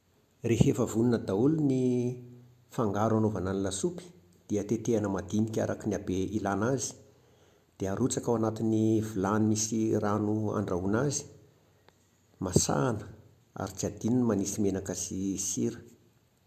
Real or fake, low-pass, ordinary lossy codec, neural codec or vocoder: real; 14.4 kHz; AAC, 96 kbps; none